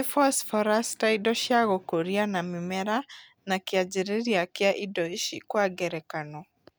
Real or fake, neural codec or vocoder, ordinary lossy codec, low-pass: real; none; none; none